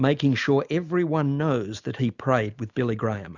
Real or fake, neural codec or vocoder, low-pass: real; none; 7.2 kHz